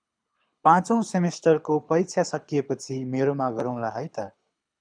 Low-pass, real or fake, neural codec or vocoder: 9.9 kHz; fake; codec, 24 kHz, 6 kbps, HILCodec